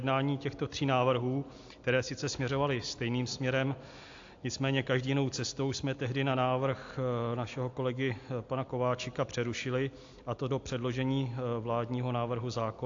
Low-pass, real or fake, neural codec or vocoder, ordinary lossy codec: 7.2 kHz; real; none; AAC, 48 kbps